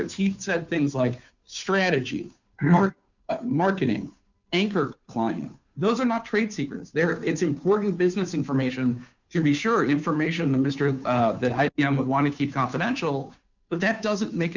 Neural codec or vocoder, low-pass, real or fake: codec, 16 kHz, 2 kbps, FunCodec, trained on Chinese and English, 25 frames a second; 7.2 kHz; fake